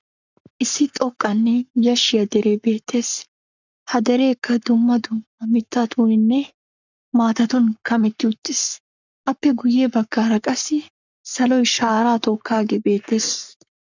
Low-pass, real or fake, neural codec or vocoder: 7.2 kHz; fake; codec, 44.1 kHz, 7.8 kbps, Pupu-Codec